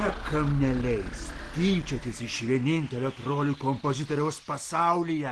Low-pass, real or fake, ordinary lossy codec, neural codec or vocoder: 10.8 kHz; real; Opus, 16 kbps; none